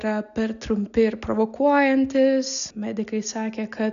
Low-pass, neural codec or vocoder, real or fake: 7.2 kHz; none; real